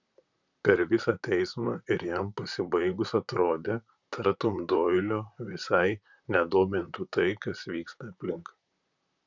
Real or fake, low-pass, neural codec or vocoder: fake; 7.2 kHz; vocoder, 44.1 kHz, 128 mel bands, Pupu-Vocoder